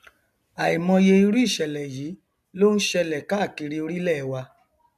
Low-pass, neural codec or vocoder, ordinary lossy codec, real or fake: 14.4 kHz; none; none; real